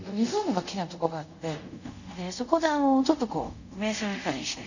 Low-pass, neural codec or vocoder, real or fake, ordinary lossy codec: 7.2 kHz; codec, 24 kHz, 0.5 kbps, DualCodec; fake; none